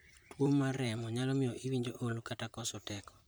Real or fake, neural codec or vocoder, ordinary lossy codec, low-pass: fake; vocoder, 44.1 kHz, 128 mel bands, Pupu-Vocoder; none; none